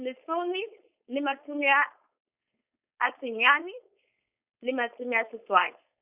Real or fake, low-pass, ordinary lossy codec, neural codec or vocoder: fake; 3.6 kHz; Opus, 64 kbps; codec, 16 kHz, 4.8 kbps, FACodec